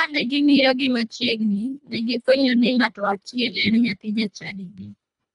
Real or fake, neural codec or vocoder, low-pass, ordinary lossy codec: fake; codec, 24 kHz, 1.5 kbps, HILCodec; 10.8 kHz; none